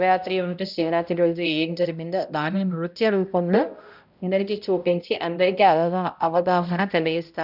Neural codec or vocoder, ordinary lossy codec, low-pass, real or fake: codec, 16 kHz, 0.5 kbps, X-Codec, HuBERT features, trained on balanced general audio; none; 5.4 kHz; fake